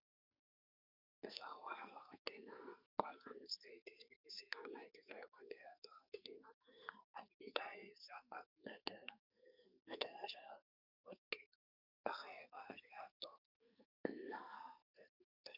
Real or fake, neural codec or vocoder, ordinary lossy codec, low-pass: fake; codec, 44.1 kHz, 2.6 kbps, SNAC; Opus, 64 kbps; 5.4 kHz